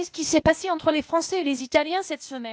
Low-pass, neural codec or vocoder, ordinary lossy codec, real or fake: none; codec, 16 kHz, 0.8 kbps, ZipCodec; none; fake